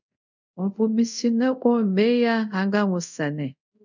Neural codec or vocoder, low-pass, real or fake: codec, 24 kHz, 0.5 kbps, DualCodec; 7.2 kHz; fake